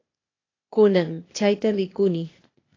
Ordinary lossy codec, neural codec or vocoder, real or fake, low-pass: AAC, 32 kbps; codec, 16 kHz, 0.8 kbps, ZipCodec; fake; 7.2 kHz